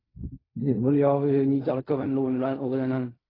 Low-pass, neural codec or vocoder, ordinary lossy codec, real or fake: 5.4 kHz; codec, 16 kHz in and 24 kHz out, 0.4 kbps, LongCat-Audio-Codec, fine tuned four codebook decoder; AAC, 24 kbps; fake